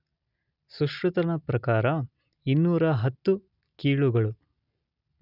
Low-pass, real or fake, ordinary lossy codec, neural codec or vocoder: 5.4 kHz; real; none; none